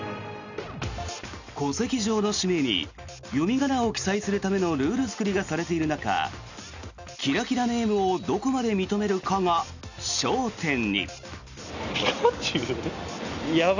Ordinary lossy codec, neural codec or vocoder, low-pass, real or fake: none; none; 7.2 kHz; real